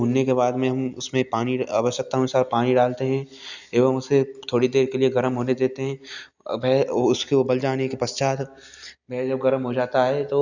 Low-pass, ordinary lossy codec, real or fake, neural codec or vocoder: 7.2 kHz; none; real; none